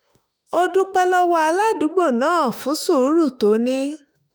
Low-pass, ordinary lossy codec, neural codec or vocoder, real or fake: none; none; autoencoder, 48 kHz, 32 numbers a frame, DAC-VAE, trained on Japanese speech; fake